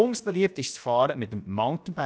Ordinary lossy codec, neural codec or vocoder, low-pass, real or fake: none; codec, 16 kHz, about 1 kbps, DyCAST, with the encoder's durations; none; fake